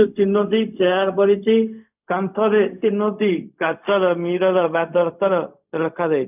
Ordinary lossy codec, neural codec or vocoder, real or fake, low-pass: none; codec, 16 kHz, 0.4 kbps, LongCat-Audio-Codec; fake; 3.6 kHz